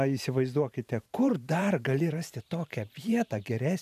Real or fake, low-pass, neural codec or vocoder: fake; 14.4 kHz; vocoder, 44.1 kHz, 128 mel bands every 512 samples, BigVGAN v2